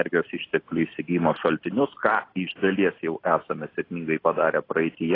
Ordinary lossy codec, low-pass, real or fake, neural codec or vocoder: AAC, 24 kbps; 5.4 kHz; real; none